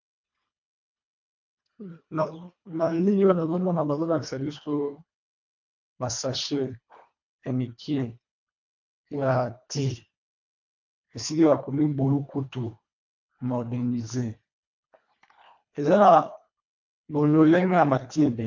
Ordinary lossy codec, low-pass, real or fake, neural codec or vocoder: MP3, 48 kbps; 7.2 kHz; fake; codec, 24 kHz, 1.5 kbps, HILCodec